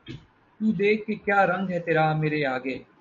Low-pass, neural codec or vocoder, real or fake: 7.2 kHz; none; real